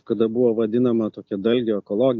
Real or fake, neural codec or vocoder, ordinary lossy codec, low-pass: real; none; MP3, 48 kbps; 7.2 kHz